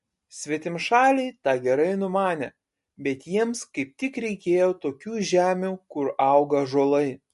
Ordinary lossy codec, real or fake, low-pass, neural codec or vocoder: MP3, 48 kbps; real; 14.4 kHz; none